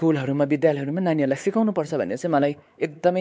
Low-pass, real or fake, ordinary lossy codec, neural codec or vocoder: none; fake; none; codec, 16 kHz, 4 kbps, X-Codec, WavLM features, trained on Multilingual LibriSpeech